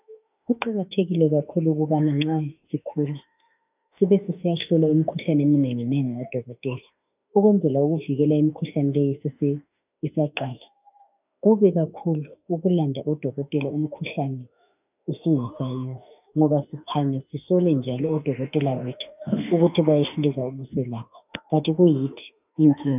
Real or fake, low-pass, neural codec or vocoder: fake; 3.6 kHz; autoencoder, 48 kHz, 32 numbers a frame, DAC-VAE, trained on Japanese speech